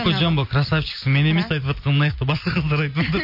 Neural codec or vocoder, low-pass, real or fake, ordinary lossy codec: none; 5.4 kHz; real; MP3, 32 kbps